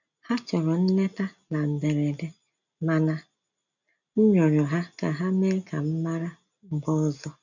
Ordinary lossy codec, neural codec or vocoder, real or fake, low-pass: none; none; real; 7.2 kHz